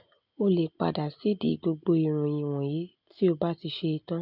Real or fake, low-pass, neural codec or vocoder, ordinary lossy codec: real; 5.4 kHz; none; none